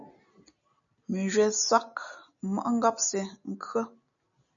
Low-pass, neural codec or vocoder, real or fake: 7.2 kHz; none; real